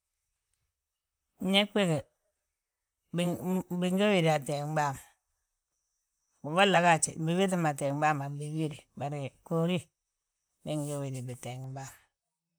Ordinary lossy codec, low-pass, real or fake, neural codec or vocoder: none; none; fake; vocoder, 44.1 kHz, 128 mel bands, Pupu-Vocoder